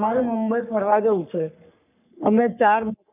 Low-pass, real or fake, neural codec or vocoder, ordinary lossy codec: 3.6 kHz; fake; codec, 44.1 kHz, 3.4 kbps, Pupu-Codec; none